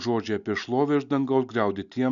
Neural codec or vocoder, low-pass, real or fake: none; 7.2 kHz; real